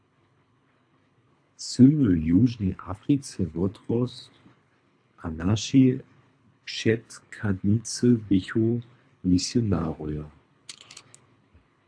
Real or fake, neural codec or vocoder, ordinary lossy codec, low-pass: fake; codec, 24 kHz, 3 kbps, HILCodec; AAC, 64 kbps; 9.9 kHz